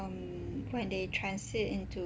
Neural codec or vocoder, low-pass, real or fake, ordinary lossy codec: none; none; real; none